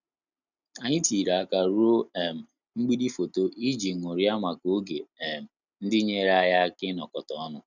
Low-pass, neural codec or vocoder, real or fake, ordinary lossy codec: 7.2 kHz; none; real; none